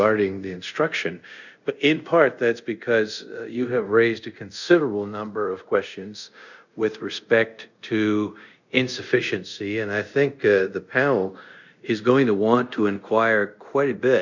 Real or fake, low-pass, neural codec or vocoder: fake; 7.2 kHz; codec, 24 kHz, 0.5 kbps, DualCodec